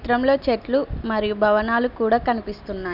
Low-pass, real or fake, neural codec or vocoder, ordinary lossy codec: 5.4 kHz; real; none; none